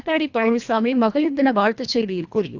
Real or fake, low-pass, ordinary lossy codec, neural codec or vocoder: fake; 7.2 kHz; Opus, 64 kbps; codec, 24 kHz, 1.5 kbps, HILCodec